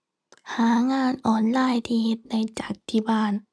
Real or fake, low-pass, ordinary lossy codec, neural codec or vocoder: real; none; none; none